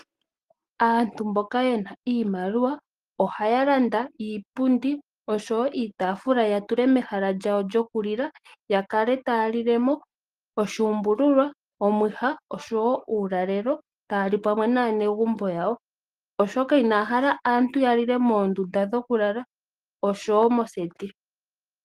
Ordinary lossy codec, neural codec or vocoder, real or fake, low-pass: Opus, 24 kbps; none; real; 14.4 kHz